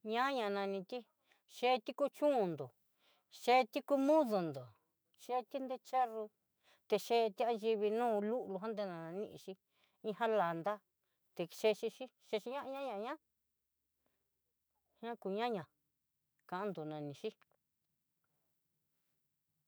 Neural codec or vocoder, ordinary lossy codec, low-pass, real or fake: autoencoder, 48 kHz, 128 numbers a frame, DAC-VAE, trained on Japanese speech; none; none; fake